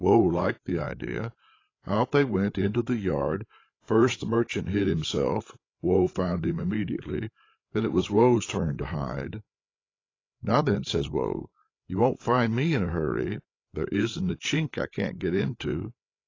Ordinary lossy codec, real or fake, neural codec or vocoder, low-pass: AAC, 32 kbps; fake; codec, 16 kHz, 8 kbps, FreqCodec, larger model; 7.2 kHz